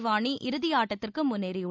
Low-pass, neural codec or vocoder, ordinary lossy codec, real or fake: none; none; none; real